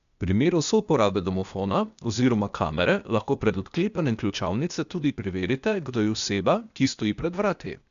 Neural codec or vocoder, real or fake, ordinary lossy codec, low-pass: codec, 16 kHz, 0.8 kbps, ZipCodec; fake; none; 7.2 kHz